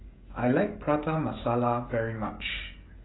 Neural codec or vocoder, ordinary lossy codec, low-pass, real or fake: codec, 16 kHz, 16 kbps, FreqCodec, smaller model; AAC, 16 kbps; 7.2 kHz; fake